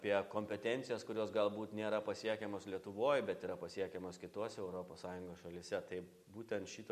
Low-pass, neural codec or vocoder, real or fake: 14.4 kHz; none; real